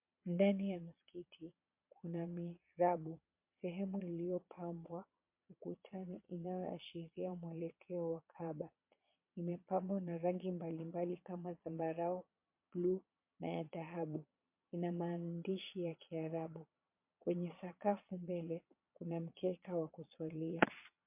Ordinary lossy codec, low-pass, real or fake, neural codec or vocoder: MP3, 32 kbps; 3.6 kHz; fake; vocoder, 22.05 kHz, 80 mel bands, Vocos